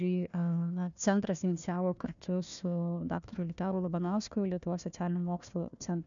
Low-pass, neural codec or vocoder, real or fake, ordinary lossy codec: 7.2 kHz; codec, 16 kHz, 1 kbps, FunCodec, trained on Chinese and English, 50 frames a second; fake; MP3, 96 kbps